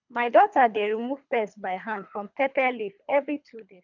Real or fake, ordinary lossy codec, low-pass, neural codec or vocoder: fake; none; 7.2 kHz; codec, 24 kHz, 3 kbps, HILCodec